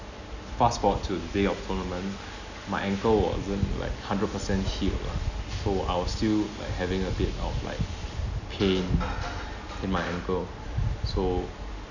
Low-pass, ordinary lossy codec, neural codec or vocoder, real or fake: 7.2 kHz; none; none; real